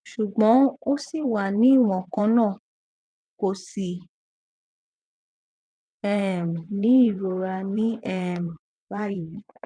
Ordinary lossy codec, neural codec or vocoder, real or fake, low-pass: none; vocoder, 22.05 kHz, 80 mel bands, WaveNeXt; fake; 9.9 kHz